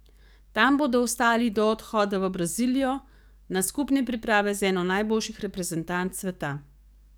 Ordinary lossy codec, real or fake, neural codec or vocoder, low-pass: none; fake; codec, 44.1 kHz, 7.8 kbps, DAC; none